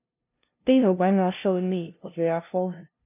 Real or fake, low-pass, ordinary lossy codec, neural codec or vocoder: fake; 3.6 kHz; none; codec, 16 kHz, 0.5 kbps, FunCodec, trained on LibriTTS, 25 frames a second